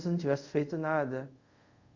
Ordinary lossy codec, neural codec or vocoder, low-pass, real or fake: Opus, 64 kbps; codec, 24 kHz, 0.5 kbps, DualCodec; 7.2 kHz; fake